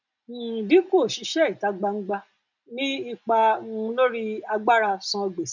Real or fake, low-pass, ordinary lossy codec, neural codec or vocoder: real; 7.2 kHz; none; none